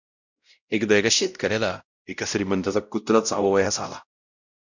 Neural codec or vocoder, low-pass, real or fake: codec, 16 kHz, 0.5 kbps, X-Codec, WavLM features, trained on Multilingual LibriSpeech; 7.2 kHz; fake